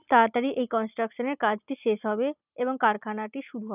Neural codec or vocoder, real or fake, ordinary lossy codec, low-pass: none; real; none; 3.6 kHz